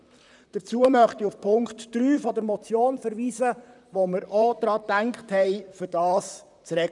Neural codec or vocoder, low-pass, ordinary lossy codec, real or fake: vocoder, 44.1 kHz, 128 mel bands, Pupu-Vocoder; 10.8 kHz; none; fake